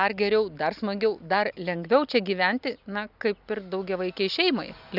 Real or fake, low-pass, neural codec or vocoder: real; 5.4 kHz; none